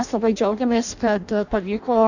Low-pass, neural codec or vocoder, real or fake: 7.2 kHz; codec, 16 kHz in and 24 kHz out, 0.6 kbps, FireRedTTS-2 codec; fake